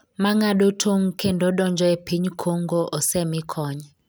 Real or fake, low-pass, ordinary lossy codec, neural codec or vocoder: real; none; none; none